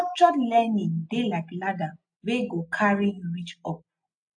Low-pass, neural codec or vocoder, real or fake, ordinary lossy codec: 9.9 kHz; none; real; AAC, 64 kbps